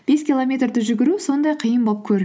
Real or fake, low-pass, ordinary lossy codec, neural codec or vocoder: real; none; none; none